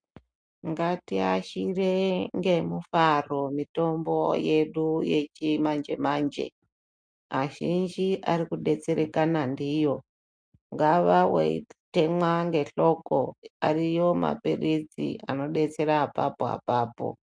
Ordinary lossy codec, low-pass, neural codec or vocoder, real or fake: MP3, 64 kbps; 9.9 kHz; none; real